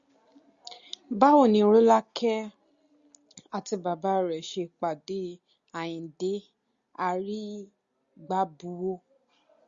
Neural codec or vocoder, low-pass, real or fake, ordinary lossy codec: none; 7.2 kHz; real; Opus, 64 kbps